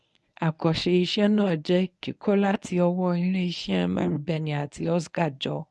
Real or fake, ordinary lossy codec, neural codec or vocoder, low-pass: fake; none; codec, 24 kHz, 0.9 kbps, WavTokenizer, medium speech release version 1; none